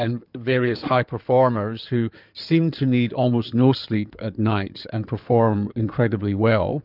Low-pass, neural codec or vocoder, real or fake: 5.4 kHz; codec, 16 kHz in and 24 kHz out, 2.2 kbps, FireRedTTS-2 codec; fake